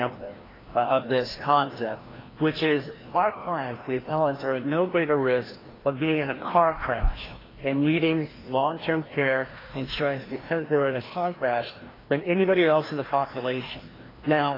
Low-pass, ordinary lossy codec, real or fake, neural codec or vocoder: 5.4 kHz; AAC, 24 kbps; fake; codec, 16 kHz, 1 kbps, FreqCodec, larger model